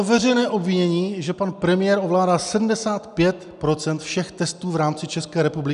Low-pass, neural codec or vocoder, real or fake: 10.8 kHz; none; real